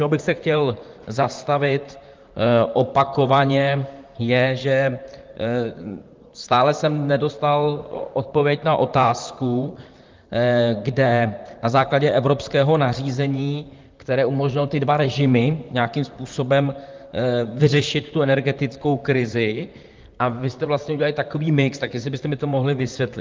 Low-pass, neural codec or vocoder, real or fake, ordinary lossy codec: 7.2 kHz; vocoder, 44.1 kHz, 128 mel bands, Pupu-Vocoder; fake; Opus, 32 kbps